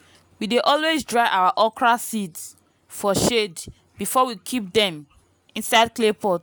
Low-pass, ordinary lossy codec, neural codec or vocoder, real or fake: none; none; none; real